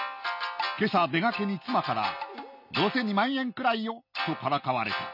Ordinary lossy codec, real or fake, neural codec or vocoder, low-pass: none; real; none; 5.4 kHz